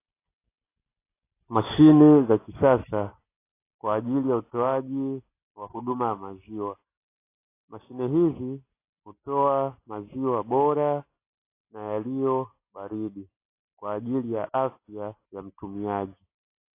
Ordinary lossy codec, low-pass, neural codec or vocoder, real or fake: AAC, 24 kbps; 3.6 kHz; none; real